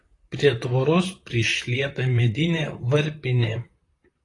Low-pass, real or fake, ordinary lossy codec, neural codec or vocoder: 10.8 kHz; fake; AAC, 32 kbps; vocoder, 44.1 kHz, 128 mel bands, Pupu-Vocoder